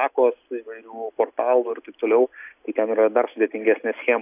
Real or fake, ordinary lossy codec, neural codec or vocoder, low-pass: real; AAC, 32 kbps; none; 3.6 kHz